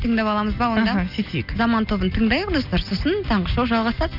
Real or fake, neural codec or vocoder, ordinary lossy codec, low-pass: real; none; none; 5.4 kHz